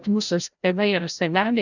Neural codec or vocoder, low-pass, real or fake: codec, 16 kHz, 0.5 kbps, FreqCodec, larger model; 7.2 kHz; fake